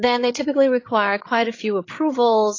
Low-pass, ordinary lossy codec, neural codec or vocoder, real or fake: 7.2 kHz; AAC, 32 kbps; codec, 16 kHz, 16 kbps, FreqCodec, larger model; fake